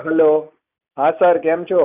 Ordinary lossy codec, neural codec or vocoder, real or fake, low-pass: none; none; real; 3.6 kHz